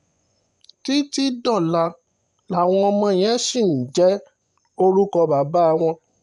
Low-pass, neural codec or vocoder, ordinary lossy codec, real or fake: 10.8 kHz; vocoder, 24 kHz, 100 mel bands, Vocos; none; fake